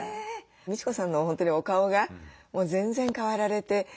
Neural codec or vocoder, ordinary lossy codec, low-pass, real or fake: none; none; none; real